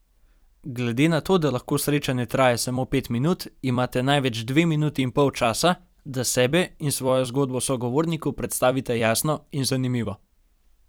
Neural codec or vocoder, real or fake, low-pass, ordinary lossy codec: vocoder, 44.1 kHz, 128 mel bands every 256 samples, BigVGAN v2; fake; none; none